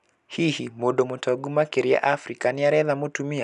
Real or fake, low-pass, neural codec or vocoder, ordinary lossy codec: real; 10.8 kHz; none; none